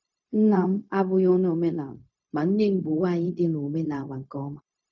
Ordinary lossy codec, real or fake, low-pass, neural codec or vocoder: none; fake; 7.2 kHz; codec, 16 kHz, 0.4 kbps, LongCat-Audio-Codec